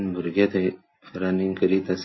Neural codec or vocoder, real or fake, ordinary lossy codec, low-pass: none; real; MP3, 24 kbps; 7.2 kHz